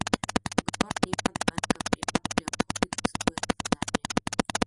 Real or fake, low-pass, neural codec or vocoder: real; 10.8 kHz; none